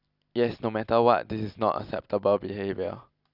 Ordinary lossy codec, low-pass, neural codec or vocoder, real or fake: none; 5.4 kHz; none; real